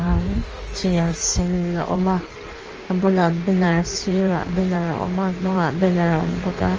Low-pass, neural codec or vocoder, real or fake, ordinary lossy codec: 7.2 kHz; codec, 16 kHz in and 24 kHz out, 1.1 kbps, FireRedTTS-2 codec; fake; Opus, 24 kbps